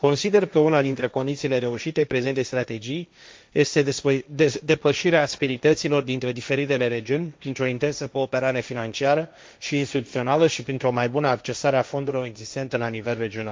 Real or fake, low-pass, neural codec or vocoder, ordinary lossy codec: fake; none; codec, 16 kHz, 1.1 kbps, Voila-Tokenizer; none